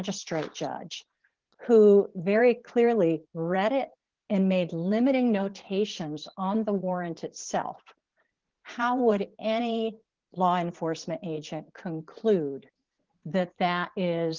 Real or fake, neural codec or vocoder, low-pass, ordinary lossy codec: fake; vocoder, 44.1 kHz, 80 mel bands, Vocos; 7.2 kHz; Opus, 16 kbps